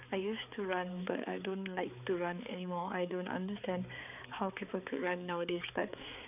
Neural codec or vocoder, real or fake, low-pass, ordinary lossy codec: codec, 16 kHz, 4 kbps, X-Codec, HuBERT features, trained on balanced general audio; fake; 3.6 kHz; none